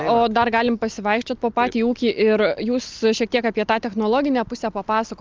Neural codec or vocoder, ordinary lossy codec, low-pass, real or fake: none; Opus, 24 kbps; 7.2 kHz; real